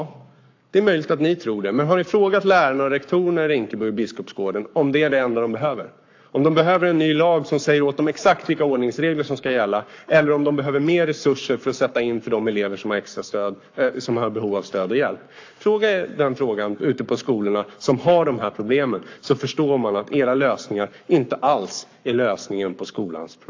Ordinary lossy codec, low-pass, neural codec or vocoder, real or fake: AAC, 48 kbps; 7.2 kHz; codec, 44.1 kHz, 7.8 kbps, Pupu-Codec; fake